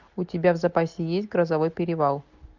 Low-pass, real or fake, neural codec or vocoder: 7.2 kHz; real; none